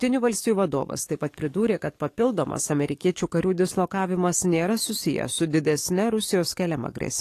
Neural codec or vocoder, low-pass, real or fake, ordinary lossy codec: codec, 44.1 kHz, 7.8 kbps, DAC; 14.4 kHz; fake; AAC, 48 kbps